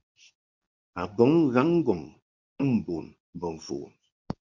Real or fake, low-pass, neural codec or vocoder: fake; 7.2 kHz; codec, 24 kHz, 0.9 kbps, WavTokenizer, medium speech release version 2